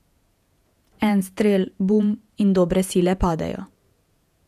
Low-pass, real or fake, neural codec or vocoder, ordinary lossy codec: 14.4 kHz; fake; vocoder, 48 kHz, 128 mel bands, Vocos; none